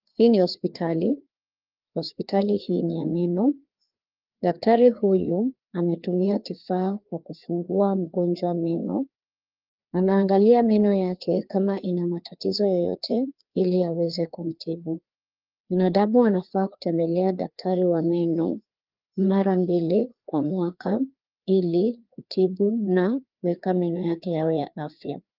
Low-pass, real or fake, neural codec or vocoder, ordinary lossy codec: 5.4 kHz; fake; codec, 16 kHz, 2 kbps, FreqCodec, larger model; Opus, 32 kbps